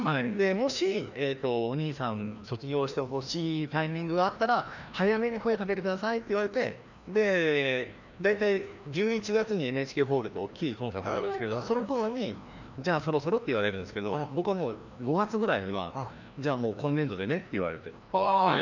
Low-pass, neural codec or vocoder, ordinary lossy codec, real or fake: 7.2 kHz; codec, 16 kHz, 1 kbps, FreqCodec, larger model; none; fake